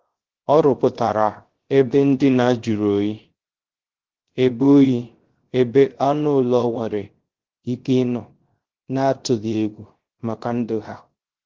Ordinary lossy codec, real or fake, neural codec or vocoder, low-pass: Opus, 16 kbps; fake; codec, 16 kHz, 0.3 kbps, FocalCodec; 7.2 kHz